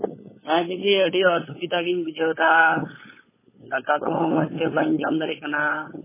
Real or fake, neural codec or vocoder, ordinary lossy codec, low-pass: fake; codec, 16 kHz, 8 kbps, FunCodec, trained on LibriTTS, 25 frames a second; MP3, 16 kbps; 3.6 kHz